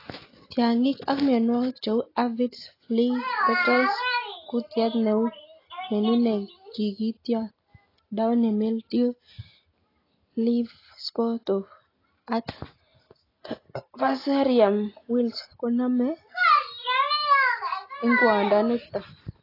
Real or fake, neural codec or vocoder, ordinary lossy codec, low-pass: real; none; AAC, 24 kbps; 5.4 kHz